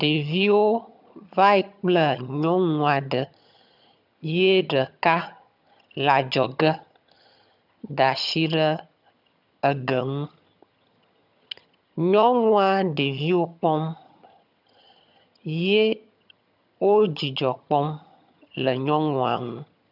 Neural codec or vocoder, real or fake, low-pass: vocoder, 22.05 kHz, 80 mel bands, HiFi-GAN; fake; 5.4 kHz